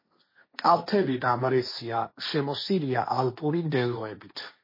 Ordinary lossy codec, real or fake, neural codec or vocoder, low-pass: MP3, 24 kbps; fake; codec, 16 kHz, 1.1 kbps, Voila-Tokenizer; 5.4 kHz